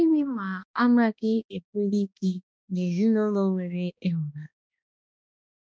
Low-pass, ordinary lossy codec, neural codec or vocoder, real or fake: none; none; codec, 16 kHz, 1 kbps, X-Codec, HuBERT features, trained on balanced general audio; fake